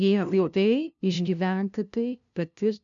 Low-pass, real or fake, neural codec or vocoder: 7.2 kHz; fake; codec, 16 kHz, 0.5 kbps, FunCodec, trained on LibriTTS, 25 frames a second